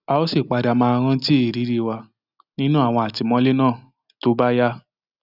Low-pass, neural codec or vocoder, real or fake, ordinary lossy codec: 5.4 kHz; none; real; none